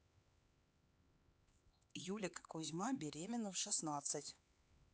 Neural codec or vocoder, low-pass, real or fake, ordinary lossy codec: codec, 16 kHz, 4 kbps, X-Codec, HuBERT features, trained on LibriSpeech; none; fake; none